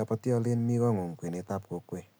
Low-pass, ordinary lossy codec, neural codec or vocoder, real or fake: none; none; none; real